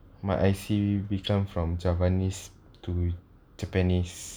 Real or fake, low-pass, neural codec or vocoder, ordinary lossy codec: real; none; none; none